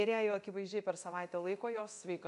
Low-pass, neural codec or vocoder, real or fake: 10.8 kHz; codec, 24 kHz, 0.9 kbps, DualCodec; fake